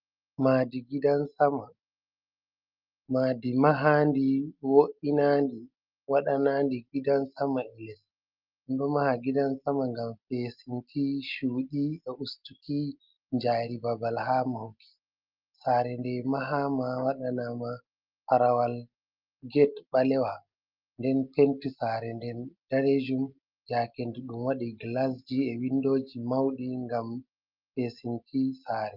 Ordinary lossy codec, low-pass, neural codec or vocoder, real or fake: Opus, 24 kbps; 5.4 kHz; none; real